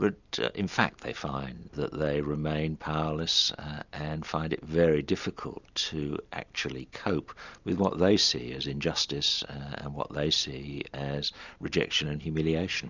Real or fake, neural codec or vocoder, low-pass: real; none; 7.2 kHz